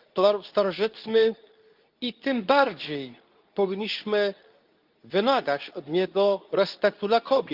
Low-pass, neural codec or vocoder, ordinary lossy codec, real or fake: 5.4 kHz; codec, 24 kHz, 0.9 kbps, WavTokenizer, medium speech release version 2; Opus, 24 kbps; fake